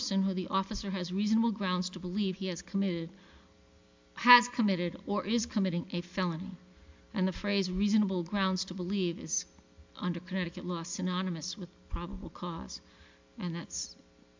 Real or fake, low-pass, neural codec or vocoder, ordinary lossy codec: real; 7.2 kHz; none; MP3, 64 kbps